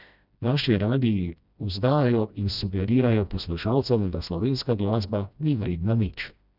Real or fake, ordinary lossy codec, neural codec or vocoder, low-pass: fake; none; codec, 16 kHz, 1 kbps, FreqCodec, smaller model; 5.4 kHz